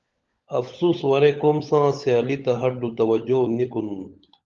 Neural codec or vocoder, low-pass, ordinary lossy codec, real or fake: codec, 16 kHz, 16 kbps, FunCodec, trained on LibriTTS, 50 frames a second; 7.2 kHz; Opus, 32 kbps; fake